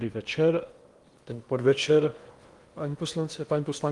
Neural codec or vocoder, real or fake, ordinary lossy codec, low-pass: codec, 16 kHz in and 24 kHz out, 0.8 kbps, FocalCodec, streaming, 65536 codes; fake; Opus, 24 kbps; 10.8 kHz